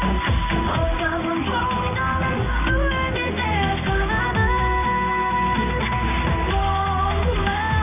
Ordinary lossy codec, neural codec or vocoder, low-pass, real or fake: none; codec, 16 kHz in and 24 kHz out, 1 kbps, XY-Tokenizer; 3.6 kHz; fake